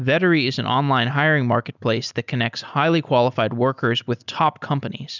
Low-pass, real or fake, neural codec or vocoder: 7.2 kHz; real; none